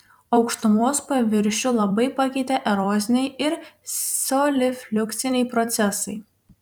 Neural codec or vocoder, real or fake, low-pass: vocoder, 44.1 kHz, 128 mel bands every 256 samples, BigVGAN v2; fake; 19.8 kHz